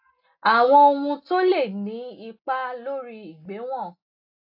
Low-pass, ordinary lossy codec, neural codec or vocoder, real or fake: 5.4 kHz; AAC, 48 kbps; none; real